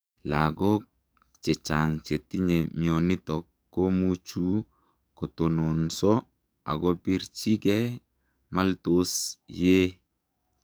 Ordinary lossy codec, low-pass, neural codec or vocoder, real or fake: none; none; codec, 44.1 kHz, 7.8 kbps, DAC; fake